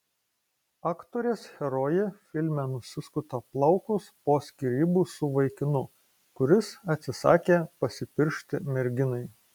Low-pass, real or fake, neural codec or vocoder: 19.8 kHz; real; none